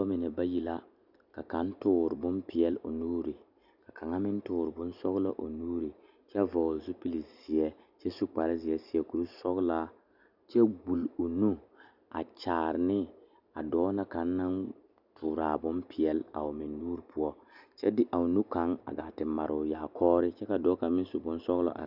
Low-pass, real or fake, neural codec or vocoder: 5.4 kHz; real; none